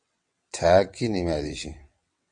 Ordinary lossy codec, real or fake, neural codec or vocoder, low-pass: MP3, 48 kbps; fake; vocoder, 22.05 kHz, 80 mel bands, WaveNeXt; 9.9 kHz